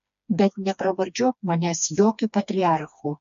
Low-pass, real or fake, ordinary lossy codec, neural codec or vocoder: 7.2 kHz; fake; MP3, 48 kbps; codec, 16 kHz, 2 kbps, FreqCodec, smaller model